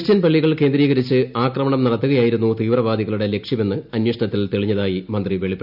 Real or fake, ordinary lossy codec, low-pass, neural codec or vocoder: real; none; 5.4 kHz; none